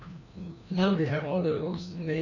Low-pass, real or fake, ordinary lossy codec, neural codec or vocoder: 7.2 kHz; fake; none; codec, 16 kHz, 1 kbps, FreqCodec, larger model